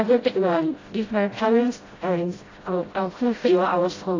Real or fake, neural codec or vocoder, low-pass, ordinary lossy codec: fake; codec, 16 kHz, 0.5 kbps, FreqCodec, smaller model; 7.2 kHz; AAC, 32 kbps